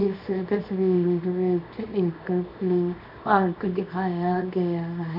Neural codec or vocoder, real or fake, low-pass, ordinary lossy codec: codec, 24 kHz, 0.9 kbps, WavTokenizer, small release; fake; 5.4 kHz; none